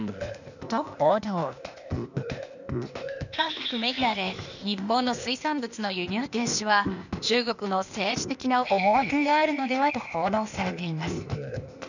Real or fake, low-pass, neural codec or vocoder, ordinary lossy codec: fake; 7.2 kHz; codec, 16 kHz, 0.8 kbps, ZipCodec; none